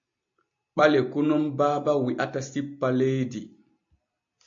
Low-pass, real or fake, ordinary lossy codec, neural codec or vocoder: 7.2 kHz; real; MP3, 48 kbps; none